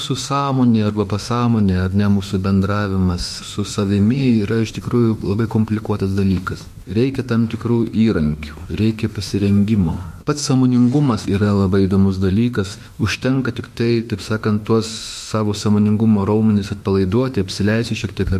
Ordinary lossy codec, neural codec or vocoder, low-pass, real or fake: MP3, 64 kbps; autoencoder, 48 kHz, 32 numbers a frame, DAC-VAE, trained on Japanese speech; 14.4 kHz; fake